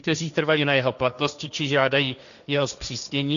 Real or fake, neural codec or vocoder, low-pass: fake; codec, 16 kHz, 1.1 kbps, Voila-Tokenizer; 7.2 kHz